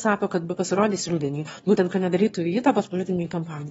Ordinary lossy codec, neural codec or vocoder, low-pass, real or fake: AAC, 24 kbps; autoencoder, 22.05 kHz, a latent of 192 numbers a frame, VITS, trained on one speaker; 9.9 kHz; fake